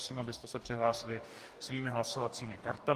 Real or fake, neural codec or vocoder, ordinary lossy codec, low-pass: fake; codec, 44.1 kHz, 2.6 kbps, DAC; Opus, 24 kbps; 14.4 kHz